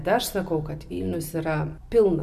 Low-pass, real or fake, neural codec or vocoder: 14.4 kHz; real; none